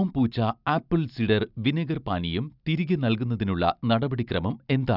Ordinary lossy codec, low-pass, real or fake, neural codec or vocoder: none; 5.4 kHz; real; none